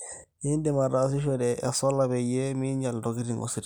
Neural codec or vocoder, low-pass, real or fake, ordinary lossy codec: none; none; real; none